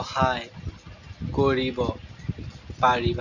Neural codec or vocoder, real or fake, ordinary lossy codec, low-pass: none; real; none; 7.2 kHz